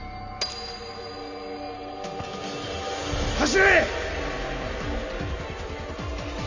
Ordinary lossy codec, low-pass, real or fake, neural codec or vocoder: none; 7.2 kHz; real; none